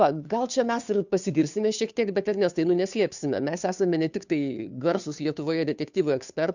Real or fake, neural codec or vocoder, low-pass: fake; codec, 16 kHz, 2 kbps, FunCodec, trained on Chinese and English, 25 frames a second; 7.2 kHz